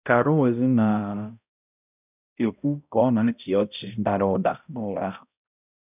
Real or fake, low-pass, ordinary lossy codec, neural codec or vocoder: fake; 3.6 kHz; none; codec, 16 kHz, 0.5 kbps, X-Codec, HuBERT features, trained on balanced general audio